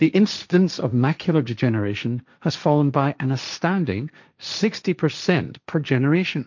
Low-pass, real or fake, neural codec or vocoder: 7.2 kHz; fake; codec, 16 kHz, 1.1 kbps, Voila-Tokenizer